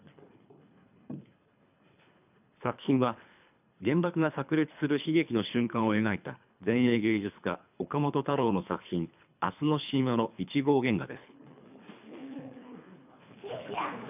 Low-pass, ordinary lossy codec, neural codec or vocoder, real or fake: 3.6 kHz; none; codec, 24 kHz, 3 kbps, HILCodec; fake